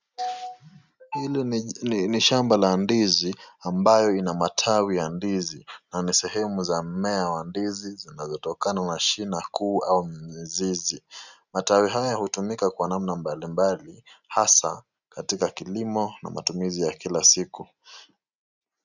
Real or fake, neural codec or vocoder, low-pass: real; none; 7.2 kHz